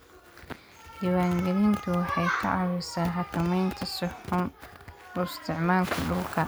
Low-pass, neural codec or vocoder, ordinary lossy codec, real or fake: none; none; none; real